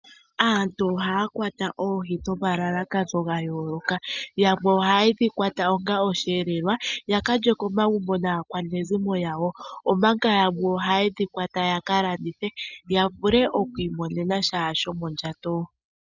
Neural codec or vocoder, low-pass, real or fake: none; 7.2 kHz; real